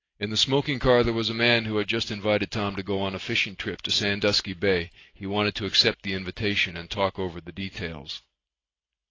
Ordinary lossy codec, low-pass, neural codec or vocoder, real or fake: AAC, 32 kbps; 7.2 kHz; none; real